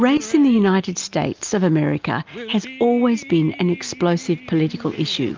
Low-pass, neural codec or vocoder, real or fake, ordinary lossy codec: 7.2 kHz; none; real; Opus, 24 kbps